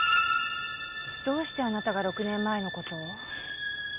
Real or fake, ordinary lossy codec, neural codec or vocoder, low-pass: real; Opus, 64 kbps; none; 3.6 kHz